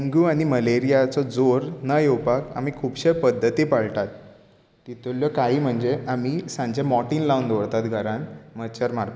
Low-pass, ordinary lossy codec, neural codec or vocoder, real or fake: none; none; none; real